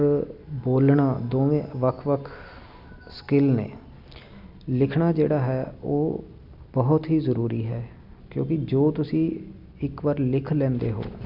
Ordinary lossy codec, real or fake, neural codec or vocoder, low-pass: none; real; none; 5.4 kHz